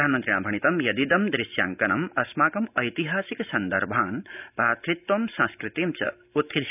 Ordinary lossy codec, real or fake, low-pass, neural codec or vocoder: none; real; 3.6 kHz; none